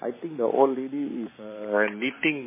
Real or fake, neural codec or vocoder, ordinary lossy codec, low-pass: fake; vocoder, 44.1 kHz, 128 mel bands every 512 samples, BigVGAN v2; MP3, 16 kbps; 3.6 kHz